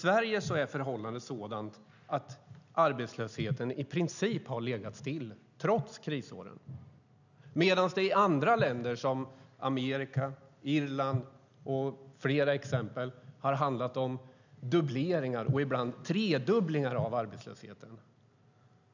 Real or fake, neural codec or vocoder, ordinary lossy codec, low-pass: real; none; none; 7.2 kHz